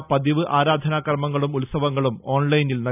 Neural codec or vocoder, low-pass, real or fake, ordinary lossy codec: none; 3.6 kHz; real; none